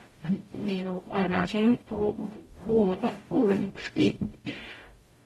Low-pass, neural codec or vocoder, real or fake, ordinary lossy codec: 19.8 kHz; codec, 44.1 kHz, 0.9 kbps, DAC; fake; AAC, 32 kbps